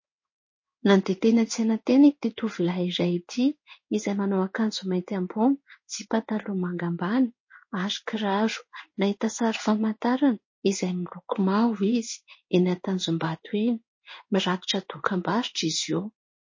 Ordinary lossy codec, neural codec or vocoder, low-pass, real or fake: MP3, 32 kbps; codec, 16 kHz in and 24 kHz out, 1 kbps, XY-Tokenizer; 7.2 kHz; fake